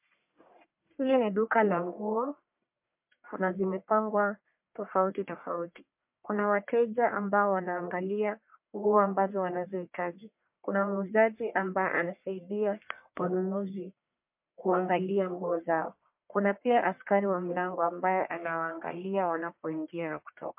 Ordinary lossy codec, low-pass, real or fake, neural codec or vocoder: MP3, 32 kbps; 3.6 kHz; fake; codec, 44.1 kHz, 1.7 kbps, Pupu-Codec